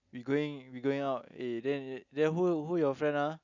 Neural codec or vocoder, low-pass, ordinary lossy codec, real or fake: none; 7.2 kHz; none; real